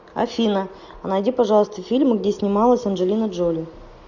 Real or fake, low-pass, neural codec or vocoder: real; 7.2 kHz; none